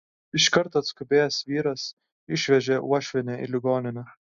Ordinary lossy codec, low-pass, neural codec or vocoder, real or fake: MP3, 64 kbps; 7.2 kHz; none; real